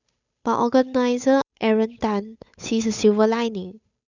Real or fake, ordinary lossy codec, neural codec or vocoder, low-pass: fake; none; codec, 16 kHz, 8 kbps, FunCodec, trained on Chinese and English, 25 frames a second; 7.2 kHz